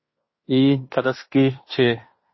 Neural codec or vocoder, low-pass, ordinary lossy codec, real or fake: codec, 16 kHz in and 24 kHz out, 0.9 kbps, LongCat-Audio-Codec, fine tuned four codebook decoder; 7.2 kHz; MP3, 24 kbps; fake